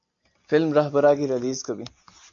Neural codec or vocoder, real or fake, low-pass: none; real; 7.2 kHz